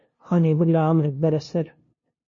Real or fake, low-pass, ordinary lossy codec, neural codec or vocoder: fake; 7.2 kHz; MP3, 32 kbps; codec, 16 kHz, 0.5 kbps, FunCodec, trained on LibriTTS, 25 frames a second